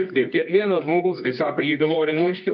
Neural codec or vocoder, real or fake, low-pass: codec, 24 kHz, 0.9 kbps, WavTokenizer, medium music audio release; fake; 7.2 kHz